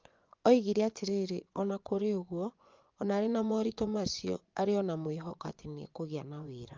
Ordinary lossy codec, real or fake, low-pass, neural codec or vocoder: Opus, 24 kbps; fake; 7.2 kHz; vocoder, 24 kHz, 100 mel bands, Vocos